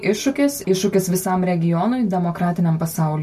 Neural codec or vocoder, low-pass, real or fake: none; 14.4 kHz; real